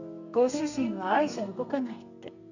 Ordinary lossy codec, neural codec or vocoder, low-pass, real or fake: none; codec, 32 kHz, 1.9 kbps, SNAC; 7.2 kHz; fake